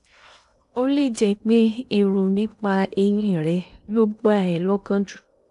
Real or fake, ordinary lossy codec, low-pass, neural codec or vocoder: fake; AAC, 96 kbps; 10.8 kHz; codec, 16 kHz in and 24 kHz out, 0.8 kbps, FocalCodec, streaming, 65536 codes